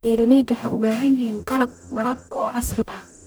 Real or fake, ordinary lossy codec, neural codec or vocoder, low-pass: fake; none; codec, 44.1 kHz, 0.9 kbps, DAC; none